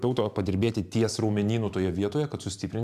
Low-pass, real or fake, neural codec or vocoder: 14.4 kHz; fake; vocoder, 44.1 kHz, 128 mel bands every 512 samples, BigVGAN v2